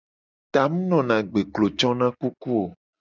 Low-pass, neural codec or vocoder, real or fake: 7.2 kHz; none; real